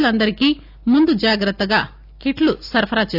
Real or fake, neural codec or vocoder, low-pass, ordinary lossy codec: real; none; 5.4 kHz; none